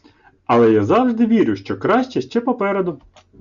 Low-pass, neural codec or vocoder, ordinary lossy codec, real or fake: 7.2 kHz; none; Opus, 64 kbps; real